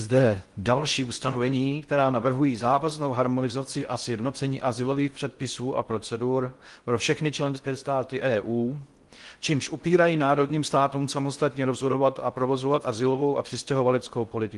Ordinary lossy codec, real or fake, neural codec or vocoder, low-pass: Opus, 24 kbps; fake; codec, 16 kHz in and 24 kHz out, 0.6 kbps, FocalCodec, streaming, 4096 codes; 10.8 kHz